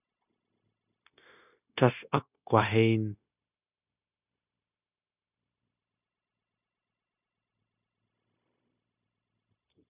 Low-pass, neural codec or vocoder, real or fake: 3.6 kHz; codec, 16 kHz, 0.9 kbps, LongCat-Audio-Codec; fake